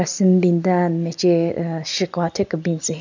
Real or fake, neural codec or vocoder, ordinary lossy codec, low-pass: real; none; none; 7.2 kHz